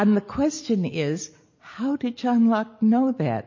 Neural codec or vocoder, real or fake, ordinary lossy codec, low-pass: none; real; MP3, 32 kbps; 7.2 kHz